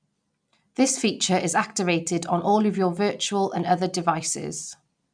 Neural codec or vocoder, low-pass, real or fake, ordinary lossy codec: none; 9.9 kHz; real; none